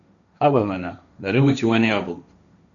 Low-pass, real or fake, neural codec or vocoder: 7.2 kHz; fake; codec, 16 kHz, 1.1 kbps, Voila-Tokenizer